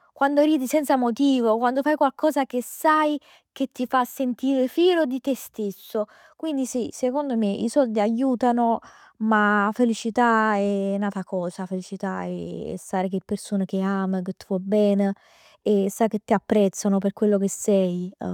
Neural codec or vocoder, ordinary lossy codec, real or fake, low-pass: none; none; real; 19.8 kHz